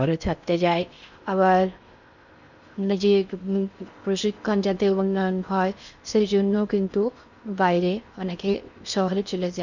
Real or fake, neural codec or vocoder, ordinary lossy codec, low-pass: fake; codec, 16 kHz in and 24 kHz out, 0.6 kbps, FocalCodec, streaming, 4096 codes; none; 7.2 kHz